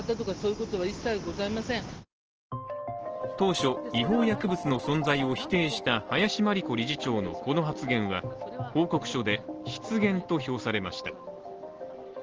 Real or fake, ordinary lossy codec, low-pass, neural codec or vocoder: real; Opus, 16 kbps; 7.2 kHz; none